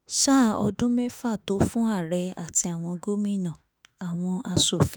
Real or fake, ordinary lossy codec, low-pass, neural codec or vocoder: fake; none; none; autoencoder, 48 kHz, 32 numbers a frame, DAC-VAE, trained on Japanese speech